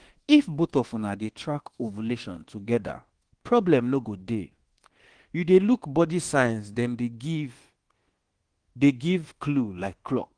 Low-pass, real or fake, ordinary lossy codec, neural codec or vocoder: 9.9 kHz; fake; Opus, 16 kbps; codec, 24 kHz, 1.2 kbps, DualCodec